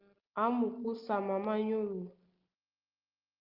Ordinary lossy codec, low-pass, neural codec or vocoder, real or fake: Opus, 24 kbps; 5.4 kHz; none; real